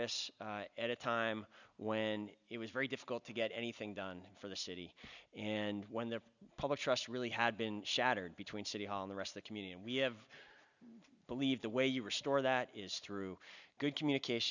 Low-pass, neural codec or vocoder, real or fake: 7.2 kHz; none; real